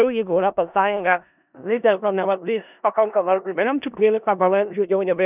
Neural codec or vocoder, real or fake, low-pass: codec, 16 kHz in and 24 kHz out, 0.4 kbps, LongCat-Audio-Codec, four codebook decoder; fake; 3.6 kHz